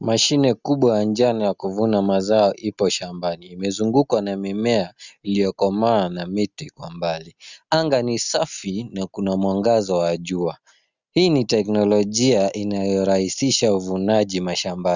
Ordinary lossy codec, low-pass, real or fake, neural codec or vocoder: Opus, 64 kbps; 7.2 kHz; real; none